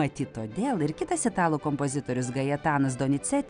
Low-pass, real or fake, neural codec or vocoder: 9.9 kHz; real; none